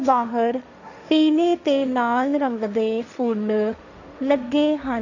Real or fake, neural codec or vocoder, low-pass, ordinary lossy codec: fake; codec, 16 kHz, 1.1 kbps, Voila-Tokenizer; 7.2 kHz; none